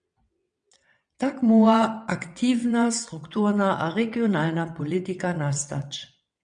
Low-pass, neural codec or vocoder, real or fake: 9.9 kHz; vocoder, 22.05 kHz, 80 mel bands, WaveNeXt; fake